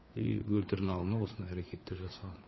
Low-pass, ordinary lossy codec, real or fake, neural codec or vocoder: 7.2 kHz; MP3, 24 kbps; fake; codec, 16 kHz, 4 kbps, FreqCodec, larger model